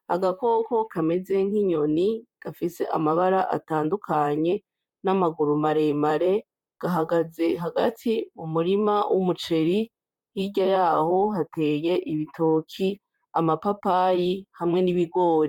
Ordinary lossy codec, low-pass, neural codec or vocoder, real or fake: MP3, 96 kbps; 19.8 kHz; vocoder, 44.1 kHz, 128 mel bands, Pupu-Vocoder; fake